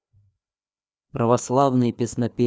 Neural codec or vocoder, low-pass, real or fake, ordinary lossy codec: codec, 16 kHz, 2 kbps, FreqCodec, larger model; none; fake; none